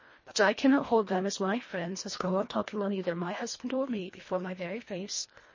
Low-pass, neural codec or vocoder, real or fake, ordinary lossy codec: 7.2 kHz; codec, 24 kHz, 1.5 kbps, HILCodec; fake; MP3, 32 kbps